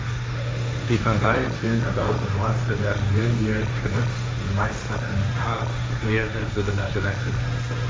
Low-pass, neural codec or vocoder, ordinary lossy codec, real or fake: none; codec, 16 kHz, 1.1 kbps, Voila-Tokenizer; none; fake